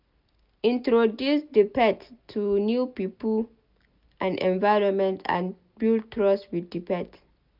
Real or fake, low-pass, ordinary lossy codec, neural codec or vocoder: real; 5.4 kHz; none; none